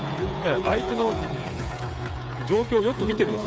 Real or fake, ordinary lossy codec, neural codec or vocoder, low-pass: fake; none; codec, 16 kHz, 8 kbps, FreqCodec, smaller model; none